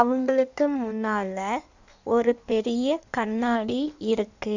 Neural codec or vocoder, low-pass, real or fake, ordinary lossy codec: codec, 16 kHz in and 24 kHz out, 1.1 kbps, FireRedTTS-2 codec; 7.2 kHz; fake; none